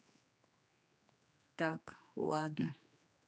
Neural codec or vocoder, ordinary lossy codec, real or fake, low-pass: codec, 16 kHz, 2 kbps, X-Codec, HuBERT features, trained on general audio; none; fake; none